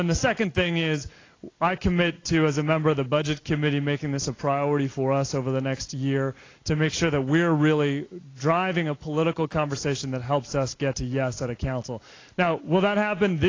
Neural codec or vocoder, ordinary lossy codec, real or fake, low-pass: none; AAC, 32 kbps; real; 7.2 kHz